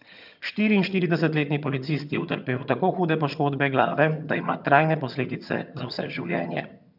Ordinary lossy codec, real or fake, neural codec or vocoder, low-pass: none; fake; vocoder, 22.05 kHz, 80 mel bands, HiFi-GAN; 5.4 kHz